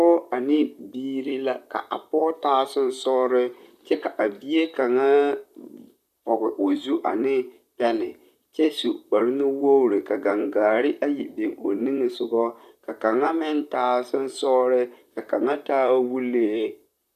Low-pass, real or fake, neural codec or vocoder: 14.4 kHz; fake; vocoder, 44.1 kHz, 128 mel bands, Pupu-Vocoder